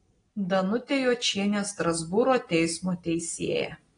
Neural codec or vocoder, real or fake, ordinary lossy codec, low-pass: none; real; AAC, 32 kbps; 9.9 kHz